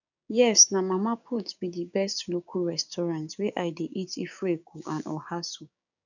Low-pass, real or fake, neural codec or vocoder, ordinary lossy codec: 7.2 kHz; fake; codec, 16 kHz, 6 kbps, DAC; none